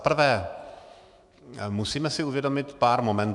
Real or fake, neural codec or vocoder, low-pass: fake; autoencoder, 48 kHz, 128 numbers a frame, DAC-VAE, trained on Japanese speech; 10.8 kHz